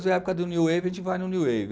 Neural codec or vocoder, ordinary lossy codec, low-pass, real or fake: none; none; none; real